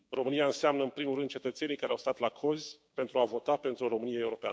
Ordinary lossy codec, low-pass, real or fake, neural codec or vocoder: none; none; fake; codec, 16 kHz, 6 kbps, DAC